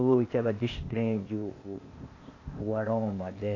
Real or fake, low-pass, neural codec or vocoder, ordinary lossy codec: fake; 7.2 kHz; codec, 16 kHz, 0.8 kbps, ZipCodec; AAC, 32 kbps